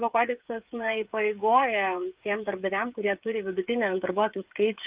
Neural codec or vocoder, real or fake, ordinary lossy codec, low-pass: codec, 16 kHz, 8 kbps, FreqCodec, smaller model; fake; Opus, 24 kbps; 3.6 kHz